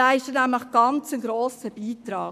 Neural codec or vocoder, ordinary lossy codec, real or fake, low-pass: codec, 44.1 kHz, 7.8 kbps, Pupu-Codec; none; fake; 14.4 kHz